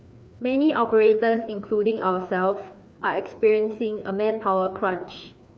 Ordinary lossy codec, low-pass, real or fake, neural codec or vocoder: none; none; fake; codec, 16 kHz, 2 kbps, FreqCodec, larger model